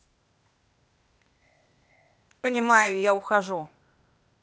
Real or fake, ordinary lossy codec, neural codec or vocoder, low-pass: fake; none; codec, 16 kHz, 0.8 kbps, ZipCodec; none